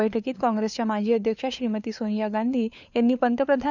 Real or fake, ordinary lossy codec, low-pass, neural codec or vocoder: fake; none; 7.2 kHz; codec, 16 kHz, 4 kbps, FunCodec, trained on LibriTTS, 50 frames a second